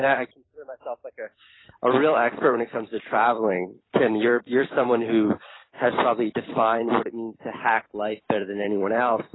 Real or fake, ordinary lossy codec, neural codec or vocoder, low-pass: fake; AAC, 16 kbps; vocoder, 44.1 kHz, 128 mel bands every 256 samples, BigVGAN v2; 7.2 kHz